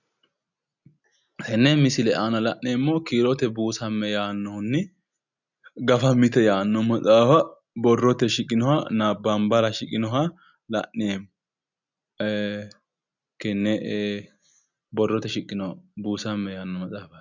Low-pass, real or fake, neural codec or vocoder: 7.2 kHz; real; none